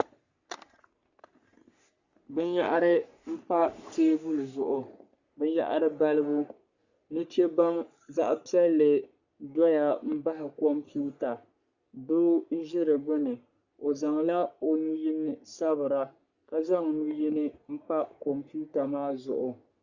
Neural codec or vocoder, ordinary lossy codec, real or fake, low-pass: codec, 44.1 kHz, 3.4 kbps, Pupu-Codec; Opus, 64 kbps; fake; 7.2 kHz